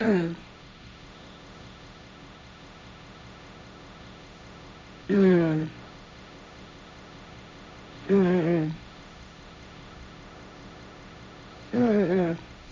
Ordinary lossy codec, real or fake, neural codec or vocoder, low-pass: none; fake; codec, 16 kHz, 1.1 kbps, Voila-Tokenizer; none